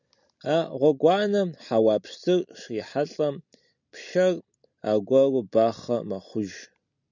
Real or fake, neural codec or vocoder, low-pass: real; none; 7.2 kHz